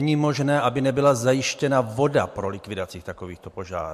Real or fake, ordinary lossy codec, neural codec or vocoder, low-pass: real; MP3, 64 kbps; none; 14.4 kHz